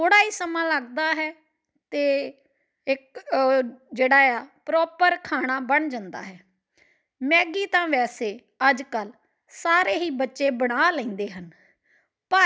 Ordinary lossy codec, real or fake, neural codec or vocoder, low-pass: none; real; none; none